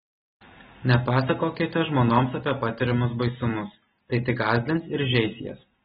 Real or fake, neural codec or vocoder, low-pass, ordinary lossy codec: real; none; 19.8 kHz; AAC, 16 kbps